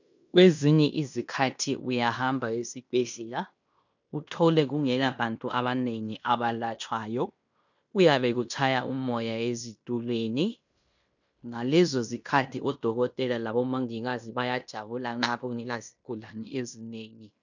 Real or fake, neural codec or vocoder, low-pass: fake; codec, 16 kHz in and 24 kHz out, 0.9 kbps, LongCat-Audio-Codec, fine tuned four codebook decoder; 7.2 kHz